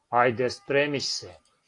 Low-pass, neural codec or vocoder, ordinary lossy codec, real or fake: 10.8 kHz; none; AAC, 48 kbps; real